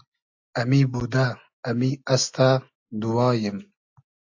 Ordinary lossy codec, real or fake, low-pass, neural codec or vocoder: AAC, 48 kbps; real; 7.2 kHz; none